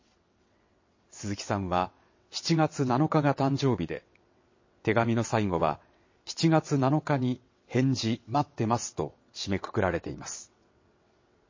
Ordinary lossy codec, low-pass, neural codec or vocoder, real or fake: MP3, 32 kbps; 7.2 kHz; vocoder, 22.05 kHz, 80 mel bands, WaveNeXt; fake